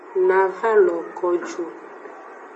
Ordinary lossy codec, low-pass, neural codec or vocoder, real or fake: MP3, 32 kbps; 10.8 kHz; none; real